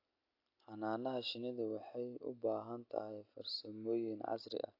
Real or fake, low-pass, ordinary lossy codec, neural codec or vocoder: real; 5.4 kHz; AAC, 32 kbps; none